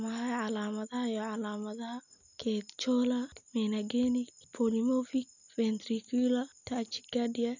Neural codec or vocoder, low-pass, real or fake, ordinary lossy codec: none; 7.2 kHz; real; none